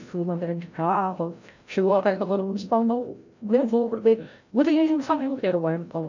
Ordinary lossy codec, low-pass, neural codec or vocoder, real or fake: none; 7.2 kHz; codec, 16 kHz, 0.5 kbps, FreqCodec, larger model; fake